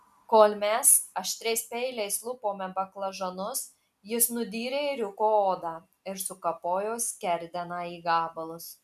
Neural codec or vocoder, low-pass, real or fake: none; 14.4 kHz; real